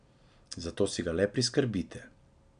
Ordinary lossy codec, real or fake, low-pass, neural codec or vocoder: none; real; 9.9 kHz; none